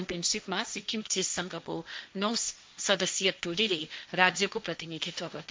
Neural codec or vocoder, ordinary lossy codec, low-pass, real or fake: codec, 16 kHz, 1.1 kbps, Voila-Tokenizer; none; none; fake